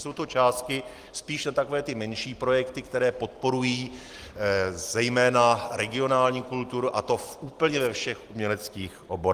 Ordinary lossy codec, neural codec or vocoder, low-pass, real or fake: Opus, 24 kbps; none; 14.4 kHz; real